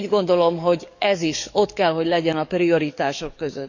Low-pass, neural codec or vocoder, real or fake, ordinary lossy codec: 7.2 kHz; codec, 44.1 kHz, 7.8 kbps, DAC; fake; none